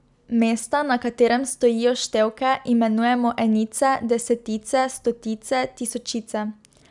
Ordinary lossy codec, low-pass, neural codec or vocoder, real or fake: none; 10.8 kHz; none; real